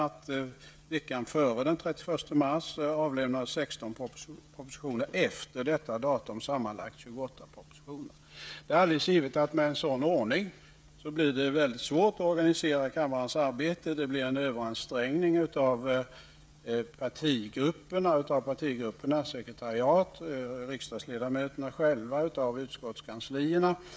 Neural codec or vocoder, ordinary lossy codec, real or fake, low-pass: codec, 16 kHz, 16 kbps, FreqCodec, smaller model; none; fake; none